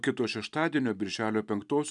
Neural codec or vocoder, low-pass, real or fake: none; 10.8 kHz; real